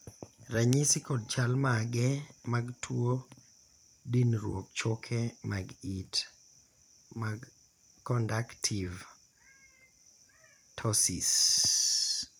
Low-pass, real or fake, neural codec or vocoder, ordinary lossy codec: none; fake; vocoder, 44.1 kHz, 128 mel bands every 256 samples, BigVGAN v2; none